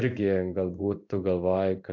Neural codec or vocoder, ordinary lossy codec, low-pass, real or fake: codec, 16 kHz in and 24 kHz out, 1 kbps, XY-Tokenizer; AAC, 48 kbps; 7.2 kHz; fake